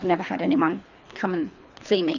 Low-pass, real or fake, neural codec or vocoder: 7.2 kHz; fake; codec, 24 kHz, 3 kbps, HILCodec